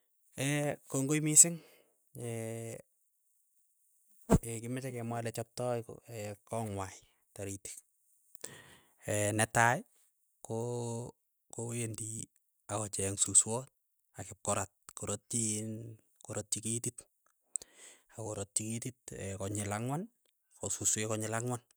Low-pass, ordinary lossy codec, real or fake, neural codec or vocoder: none; none; real; none